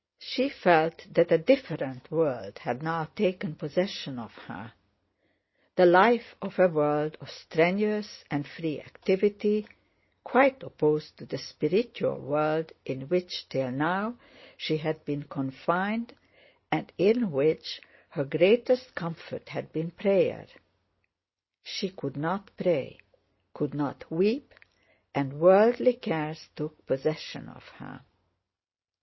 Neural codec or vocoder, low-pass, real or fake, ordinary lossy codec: none; 7.2 kHz; real; MP3, 24 kbps